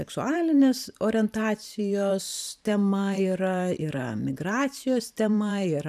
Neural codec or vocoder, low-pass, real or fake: vocoder, 44.1 kHz, 128 mel bands every 512 samples, BigVGAN v2; 14.4 kHz; fake